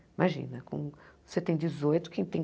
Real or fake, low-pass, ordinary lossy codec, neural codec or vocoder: real; none; none; none